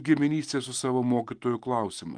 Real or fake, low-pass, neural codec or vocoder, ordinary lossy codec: real; 9.9 kHz; none; Opus, 24 kbps